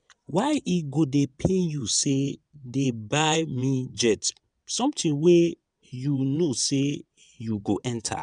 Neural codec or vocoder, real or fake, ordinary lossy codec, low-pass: vocoder, 22.05 kHz, 80 mel bands, WaveNeXt; fake; none; 9.9 kHz